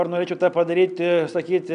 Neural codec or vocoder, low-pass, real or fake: none; 9.9 kHz; real